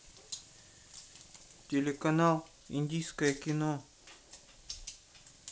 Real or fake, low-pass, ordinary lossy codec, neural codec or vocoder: real; none; none; none